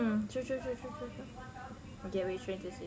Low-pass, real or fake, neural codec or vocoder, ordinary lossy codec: none; real; none; none